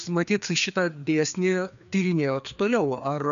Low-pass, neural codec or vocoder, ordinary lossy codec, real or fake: 7.2 kHz; codec, 16 kHz, 2 kbps, FreqCodec, larger model; MP3, 96 kbps; fake